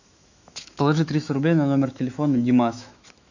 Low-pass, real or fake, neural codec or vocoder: 7.2 kHz; fake; autoencoder, 48 kHz, 128 numbers a frame, DAC-VAE, trained on Japanese speech